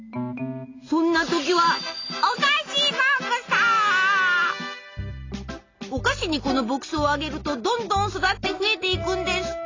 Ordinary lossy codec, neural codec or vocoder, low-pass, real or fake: AAC, 32 kbps; none; 7.2 kHz; real